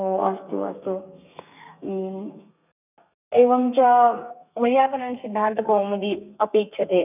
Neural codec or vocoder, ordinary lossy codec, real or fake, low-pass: codec, 32 kHz, 1.9 kbps, SNAC; none; fake; 3.6 kHz